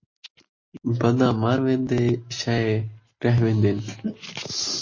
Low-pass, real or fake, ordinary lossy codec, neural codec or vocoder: 7.2 kHz; real; MP3, 32 kbps; none